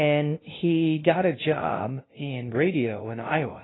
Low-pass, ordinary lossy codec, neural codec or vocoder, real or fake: 7.2 kHz; AAC, 16 kbps; codec, 16 kHz, 0.5 kbps, FunCodec, trained on LibriTTS, 25 frames a second; fake